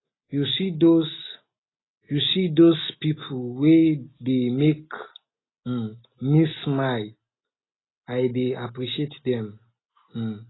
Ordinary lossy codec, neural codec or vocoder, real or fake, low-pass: AAC, 16 kbps; none; real; 7.2 kHz